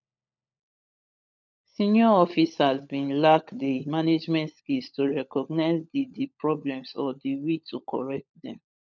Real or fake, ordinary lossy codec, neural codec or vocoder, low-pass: fake; none; codec, 16 kHz, 16 kbps, FunCodec, trained on LibriTTS, 50 frames a second; 7.2 kHz